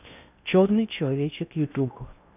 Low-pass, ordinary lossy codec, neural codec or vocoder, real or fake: 3.6 kHz; none; codec, 16 kHz in and 24 kHz out, 0.8 kbps, FocalCodec, streaming, 65536 codes; fake